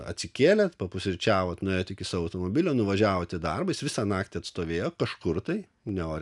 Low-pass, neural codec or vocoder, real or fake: 10.8 kHz; none; real